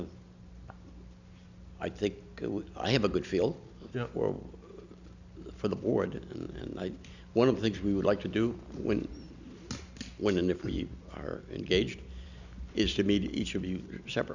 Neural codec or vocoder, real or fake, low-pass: none; real; 7.2 kHz